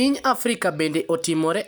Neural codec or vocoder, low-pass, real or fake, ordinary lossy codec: none; none; real; none